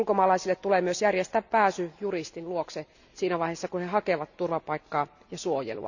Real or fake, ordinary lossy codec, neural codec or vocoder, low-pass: real; none; none; 7.2 kHz